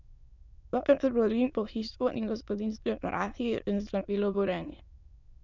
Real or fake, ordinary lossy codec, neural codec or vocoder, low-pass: fake; none; autoencoder, 22.05 kHz, a latent of 192 numbers a frame, VITS, trained on many speakers; 7.2 kHz